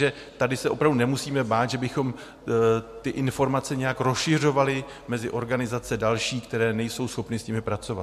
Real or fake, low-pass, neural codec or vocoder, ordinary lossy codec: real; 14.4 kHz; none; MP3, 64 kbps